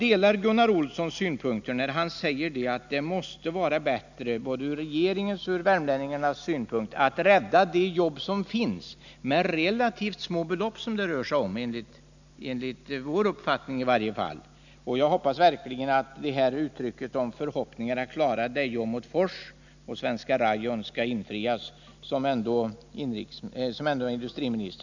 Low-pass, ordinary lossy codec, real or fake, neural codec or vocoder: 7.2 kHz; none; real; none